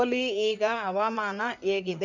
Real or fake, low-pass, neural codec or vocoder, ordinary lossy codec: fake; 7.2 kHz; codec, 16 kHz in and 24 kHz out, 2.2 kbps, FireRedTTS-2 codec; none